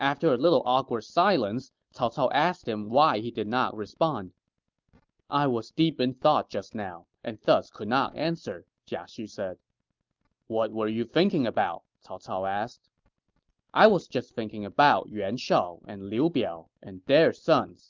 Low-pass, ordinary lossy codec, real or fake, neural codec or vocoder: 7.2 kHz; Opus, 24 kbps; real; none